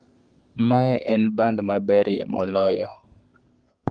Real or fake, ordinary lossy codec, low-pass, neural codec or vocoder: fake; Opus, 32 kbps; 9.9 kHz; codec, 32 kHz, 1.9 kbps, SNAC